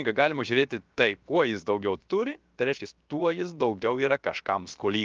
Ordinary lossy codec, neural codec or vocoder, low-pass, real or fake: Opus, 24 kbps; codec, 16 kHz, about 1 kbps, DyCAST, with the encoder's durations; 7.2 kHz; fake